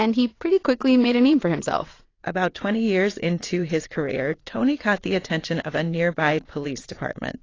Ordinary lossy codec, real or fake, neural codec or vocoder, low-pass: AAC, 32 kbps; fake; vocoder, 22.05 kHz, 80 mel bands, WaveNeXt; 7.2 kHz